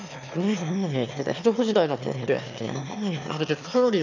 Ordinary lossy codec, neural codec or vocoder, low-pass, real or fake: none; autoencoder, 22.05 kHz, a latent of 192 numbers a frame, VITS, trained on one speaker; 7.2 kHz; fake